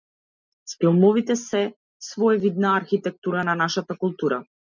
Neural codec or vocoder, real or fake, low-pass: none; real; 7.2 kHz